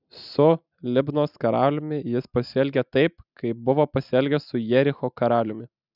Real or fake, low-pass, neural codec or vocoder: real; 5.4 kHz; none